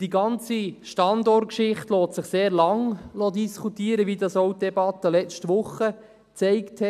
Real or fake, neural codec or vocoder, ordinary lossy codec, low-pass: real; none; none; 14.4 kHz